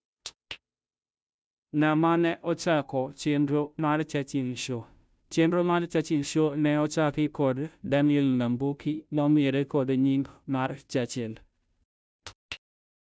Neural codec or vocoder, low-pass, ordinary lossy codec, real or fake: codec, 16 kHz, 0.5 kbps, FunCodec, trained on Chinese and English, 25 frames a second; none; none; fake